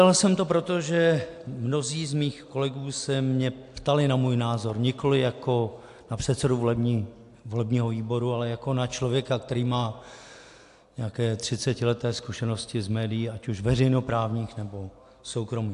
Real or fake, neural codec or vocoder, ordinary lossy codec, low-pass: real; none; AAC, 64 kbps; 10.8 kHz